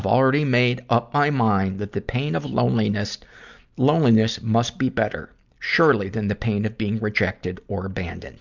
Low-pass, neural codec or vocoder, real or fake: 7.2 kHz; none; real